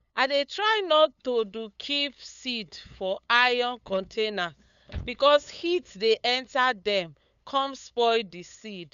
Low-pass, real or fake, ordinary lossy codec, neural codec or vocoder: 7.2 kHz; fake; none; codec, 16 kHz, 8 kbps, FunCodec, trained on LibriTTS, 25 frames a second